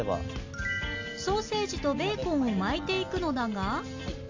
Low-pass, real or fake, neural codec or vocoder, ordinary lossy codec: 7.2 kHz; real; none; AAC, 48 kbps